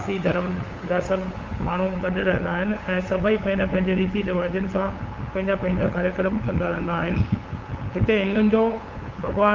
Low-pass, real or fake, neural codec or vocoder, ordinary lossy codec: 7.2 kHz; fake; codec, 16 kHz, 8 kbps, FunCodec, trained on LibriTTS, 25 frames a second; Opus, 32 kbps